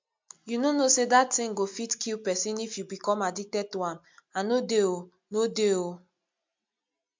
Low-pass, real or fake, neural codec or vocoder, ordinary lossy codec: 7.2 kHz; real; none; MP3, 64 kbps